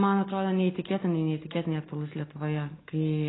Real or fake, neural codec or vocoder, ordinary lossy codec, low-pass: real; none; AAC, 16 kbps; 7.2 kHz